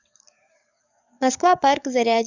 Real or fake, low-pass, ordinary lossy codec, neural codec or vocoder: fake; 7.2 kHz; none; codec, 44.1 kHz, 7.8 kbps, DAC